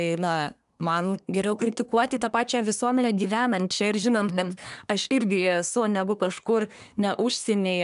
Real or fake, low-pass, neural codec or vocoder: fake; 10.8 kHz; codec, 24 kHz, 1 kbps, SNAC